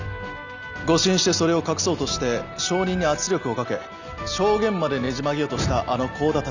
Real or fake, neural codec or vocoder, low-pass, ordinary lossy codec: real; none; 7.2 kHz; none